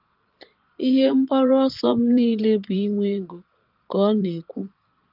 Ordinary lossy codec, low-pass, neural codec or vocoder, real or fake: Opus, 32 kbps; 5.4 kHz; vocoder, 22.05 kHz, 80 mel bands, Vocos; fake